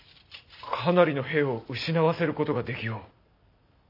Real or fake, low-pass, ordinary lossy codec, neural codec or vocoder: real; 5.4 kHz; none; none